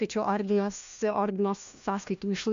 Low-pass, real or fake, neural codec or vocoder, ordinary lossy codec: 7.2 kHz; fake; codec, 16 kHz, 1 kbps, FunCodec, trained on LibriTTS, 50 frames a second; MP3, 64 kbps